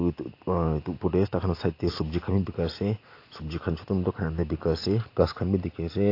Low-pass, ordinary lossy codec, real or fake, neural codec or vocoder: 5.4 kHz; AAC, 32 kbps; real; none